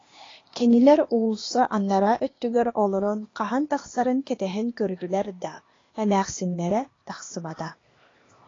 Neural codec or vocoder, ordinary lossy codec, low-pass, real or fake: codec, 16 kHz, 0.8 kbps, ZipCodec; AAC, 32 kbps; 7.2 kHz; fake